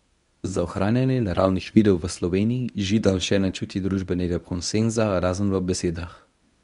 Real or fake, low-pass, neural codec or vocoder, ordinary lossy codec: fake; 10.8 kHz; codec, 24 kHz, 0.9 kbps, WavTokenizer, medium speech release version 1; none